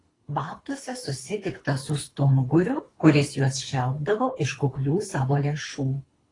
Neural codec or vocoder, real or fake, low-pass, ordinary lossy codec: codec, 24 kHz, 3 kbps, HILCodec; fake; 10.8 kHz; AAC, 32 kbps